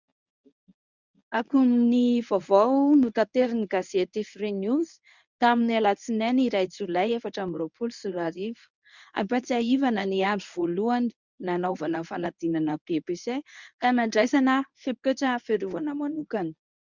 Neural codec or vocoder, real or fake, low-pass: codec, 24 kHz, 0.9 kbps, WavTokenizer, medium speech release version 1; fake; 7.2 kHz